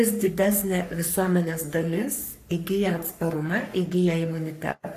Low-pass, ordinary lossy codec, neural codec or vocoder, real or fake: 14.4 kHz; AAC, 64 kbps; codec, 44.1 kHz, 3.4 kbps, Pupu-Codec; fake